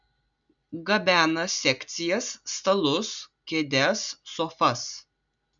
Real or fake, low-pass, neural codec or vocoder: real; 7.2 kHz; none